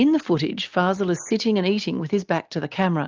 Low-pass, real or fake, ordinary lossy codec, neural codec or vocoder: 7.2 kHz; real; Opus, 32 kbps; none